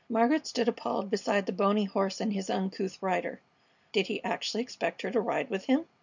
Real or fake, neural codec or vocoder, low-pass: real; none; 7.2 kHz